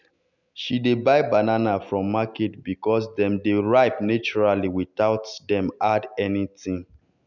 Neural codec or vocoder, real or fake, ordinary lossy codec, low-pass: none; real; none; 7.2 kHz